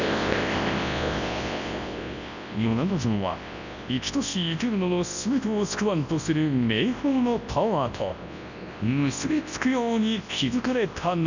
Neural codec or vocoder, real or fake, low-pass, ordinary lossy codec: codec, 24 kHz, 0.9 kbps, WavTokenizer, large speech release; fake; 7.2 kHz; none